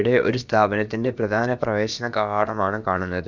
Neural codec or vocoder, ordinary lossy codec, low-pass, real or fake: codec, 16 kHz, about 1 kbps, DyCAST, with the encoder's durations; none; 7.2 kHz; fake